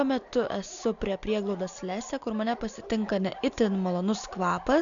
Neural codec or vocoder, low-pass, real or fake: none; 7.2 kHz; real